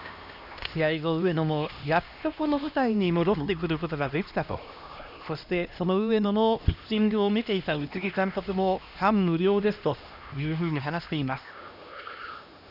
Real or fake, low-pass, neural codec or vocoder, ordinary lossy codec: fake; 5.4 kHz; codec, 16 kHz, 1 kbps, X-Codec, HuBERT features, trained on LibriSpeech; none